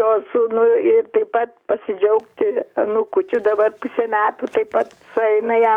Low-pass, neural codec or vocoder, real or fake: 19.8 kHz; codec, 44.1 kHz, 7.8 kbps, DAC; fake